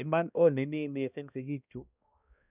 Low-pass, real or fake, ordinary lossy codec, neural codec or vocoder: 3.6 kHz; fake; none; codec, 16 kHz, 1 kbps, X-Codec, HuBERT features, trained on balanced general audio